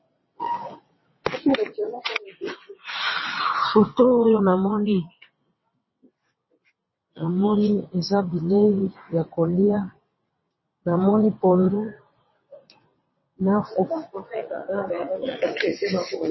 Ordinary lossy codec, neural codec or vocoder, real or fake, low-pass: MP3, 24 kbps; vocoder, 22.05 kHz, 80 mel bands, WaveNeXt; fake; 7.2 kHz